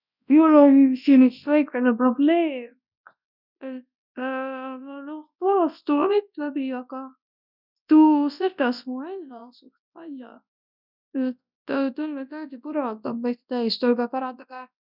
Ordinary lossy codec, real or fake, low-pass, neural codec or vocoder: none; fake; 5.4 kHz; codec, 24 kHz, 0.9 kbps, WavTokenizer, large speech release